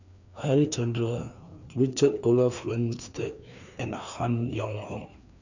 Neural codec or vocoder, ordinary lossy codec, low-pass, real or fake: codec, 16 kHz, 2 kbps, FreqCodec, larger model; none; 7.2 kHz; fake